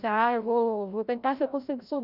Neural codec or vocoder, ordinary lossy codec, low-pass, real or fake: codec, 16 kHz, 0.5 kbps, FreqCodec, larger model; none; 5.4 kHz; fake